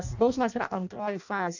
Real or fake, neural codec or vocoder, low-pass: fake; codec, 16 kHz in and 24 kHz out, 0.6 kbps, FireRedTTS-2 codec; 7.2 kHz